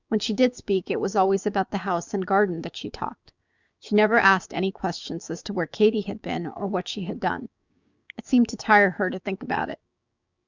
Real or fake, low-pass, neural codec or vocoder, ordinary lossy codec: fake; 7.2 kHz; codec, 16 kHz, 6 kbps, DAC; Opus, 64 kbps